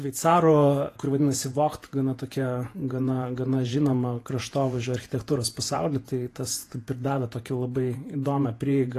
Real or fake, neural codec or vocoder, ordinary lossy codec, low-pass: fake; vocoder, 48 kHz, 128 mel bands, Vocos; AAC, 48 kbps; 14.4 kHz